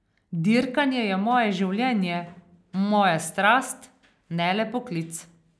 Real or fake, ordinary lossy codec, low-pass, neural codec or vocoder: real; none; none; none